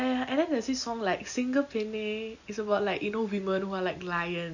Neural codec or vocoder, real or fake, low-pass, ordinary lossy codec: none; real; 7.2 kHz; none